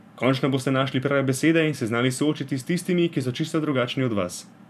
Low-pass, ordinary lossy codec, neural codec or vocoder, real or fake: 14.4 kHz; none; vocoder, 44.1 kHz, 128 mel bands every 512 samples, BigVGAN v2; fake